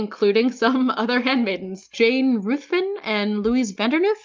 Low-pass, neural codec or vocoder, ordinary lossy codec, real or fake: 7.2 kHz; none; Opus, 24 kbps; real